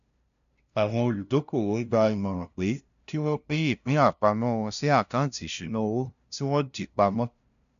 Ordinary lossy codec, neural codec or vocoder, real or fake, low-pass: none; codec, 16 kHz, 0.5 kbps, FunCodec, trained on LibriTTS, 25 frames a second; fake; 7.2 kHz